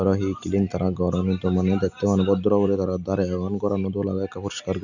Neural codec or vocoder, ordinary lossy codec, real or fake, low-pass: none; none; real; 7.2 kHz